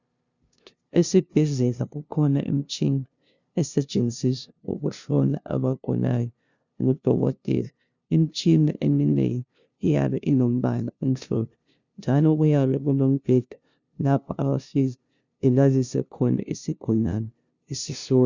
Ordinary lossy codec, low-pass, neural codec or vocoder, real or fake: Opus, 64 kbps; 7.2 kHz; codec, 16 kHz, 0.5 kbps, FunCodec, trained on LibriTTS, 25 frames a second; fake